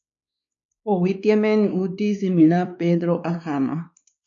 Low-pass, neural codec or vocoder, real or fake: 7.2 kHz; codec, 16 kHz, 2 kbps, X-Codec, WavLM features, trained on Multilingual LibriSpeech; fake